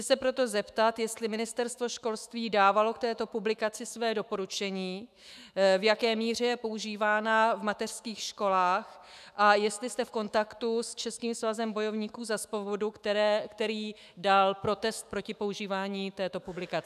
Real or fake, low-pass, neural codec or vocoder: fake; 14.4 kHz; autoencoder, 48 kHz, 128 numbers a frame, DAC-VAE, trained on Japanese speech